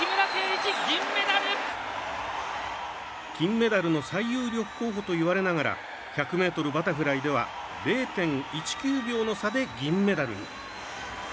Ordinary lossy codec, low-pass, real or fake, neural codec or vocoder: none; none; real; none